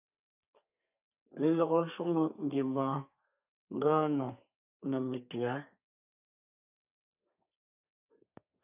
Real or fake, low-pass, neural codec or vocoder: fake; 3.6 kHz; codec, 32 kHz, 1.9 kbps, SNAC